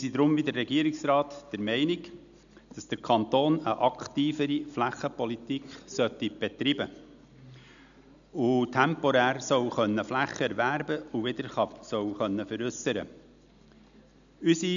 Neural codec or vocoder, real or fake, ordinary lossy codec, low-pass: none; real; none; 7.2 kHz